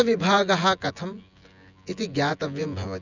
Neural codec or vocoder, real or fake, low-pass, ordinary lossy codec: vocoder, 24 kHz, 100 mel bands, Vocos; fake; 7.2 kHz; none